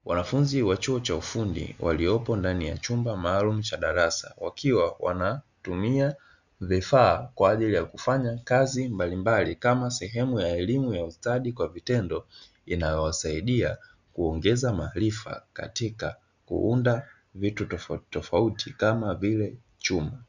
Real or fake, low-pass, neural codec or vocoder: real; 7.2 kHz; none